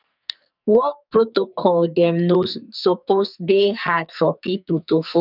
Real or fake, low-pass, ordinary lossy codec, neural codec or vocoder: fake; 5.4 kHz; none; codec, 44.1 kHz, 2.6 kbps, SNAC